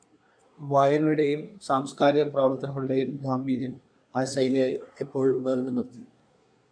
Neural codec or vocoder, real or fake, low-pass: codec, 24 kHz, 1 kbps, SNAC; fake; 9.9 kHz